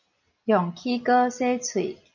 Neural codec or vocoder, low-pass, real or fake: none; 7.2 kHz; real